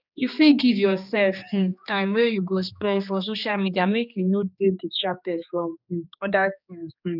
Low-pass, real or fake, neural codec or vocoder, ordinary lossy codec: 5.4 kHz; fake; codec, 16 kHz, 2 kbps, X-Codec, HuBERT features, trained on general audio; none